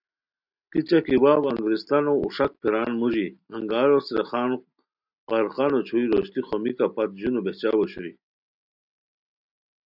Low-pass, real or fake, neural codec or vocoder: 5.4 kHz; real; none